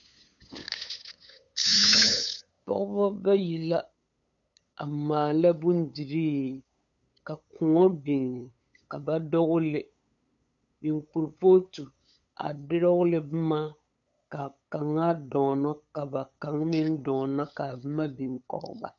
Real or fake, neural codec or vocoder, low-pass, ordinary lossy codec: fake; codec, 16 kHz, 8 kbps, FunCodec, trained on LibriTTS, 25 frames a second; 7.2 kHz; MP3, 96 kbps